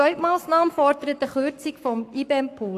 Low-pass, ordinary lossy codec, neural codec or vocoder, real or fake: 14.4 kHz; AAC, 64 kbps; codec, 44.1 kHz, 7.8 kbps, Pupu-Codec; fake